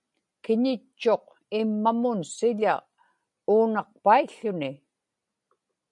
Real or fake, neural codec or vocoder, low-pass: real; none; 10.8 kHz